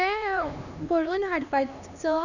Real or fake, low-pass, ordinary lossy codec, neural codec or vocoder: fake; 7.2 kHz; none; codec, 16 kHz, 2 kbps, X-Codec, HuBERT features, trained on LibriSpeech